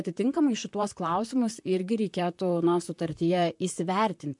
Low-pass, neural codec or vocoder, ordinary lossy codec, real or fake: 10.8 kHz; vocoder, 44.1 kHz, 128 mel bands, Pupu-Vocoder; MP3, 64 kbps; fake